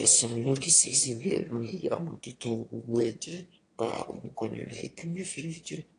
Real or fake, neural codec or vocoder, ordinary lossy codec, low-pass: fake; autoencoder, 22.05 kHz, a latent of 192 numbers a frame, VITS, trained on one speaker; AAC, 48 kbps; 9.9 kHz